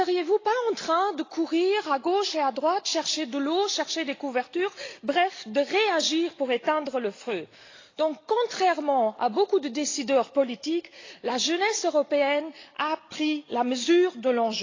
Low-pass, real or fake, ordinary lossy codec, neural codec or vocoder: 7.2 kHz; real; AAC, 32 kbps; none